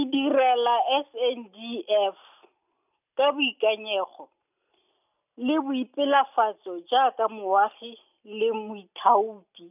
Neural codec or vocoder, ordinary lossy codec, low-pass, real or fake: none; none; 3.6 kHz; real